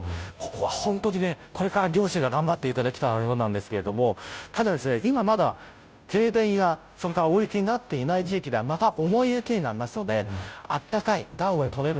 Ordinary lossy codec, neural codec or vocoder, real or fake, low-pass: none; codec, 16 kHz, 0.5 kbps, FunCodec, trained on Chinese and English, 25 frames a second; fake; none